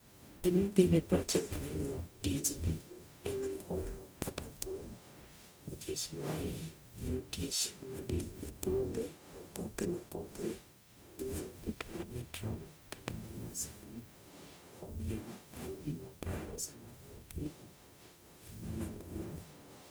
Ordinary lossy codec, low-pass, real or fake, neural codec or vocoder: none; none; fake; codec, 44.1 kHz, 0.9 kbps, DAC